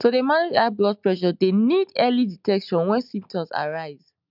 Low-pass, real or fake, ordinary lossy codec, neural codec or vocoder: 5.4 kHz; real; none; none